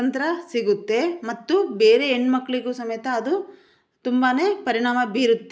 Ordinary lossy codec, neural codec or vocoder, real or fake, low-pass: none; none; real; none